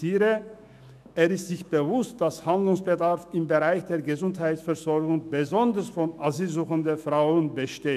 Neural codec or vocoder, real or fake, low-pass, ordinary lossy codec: autoencoder, 48 kHz, 128 numbers a frame, DAC-VAE, trained on Japanese speech; fake; 14.4 kHz; none